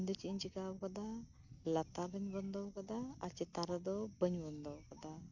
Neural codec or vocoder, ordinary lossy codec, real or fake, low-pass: none; none; real; 7.2 kHz